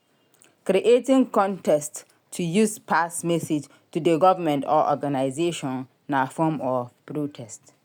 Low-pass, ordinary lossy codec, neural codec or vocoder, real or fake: none; none; none; real